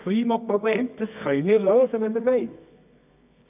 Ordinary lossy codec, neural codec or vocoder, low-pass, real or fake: none; codec, 24 kHz, 0.9 kbps, WavTokenizer, medium music audio release; 3.6 kHz; fake